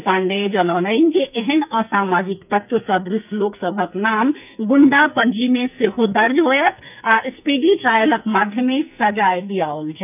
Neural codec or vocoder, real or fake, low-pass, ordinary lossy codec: codec, 44.1 kHz, 2.6 kbps, SNAC; fake; 3.6 kHz; none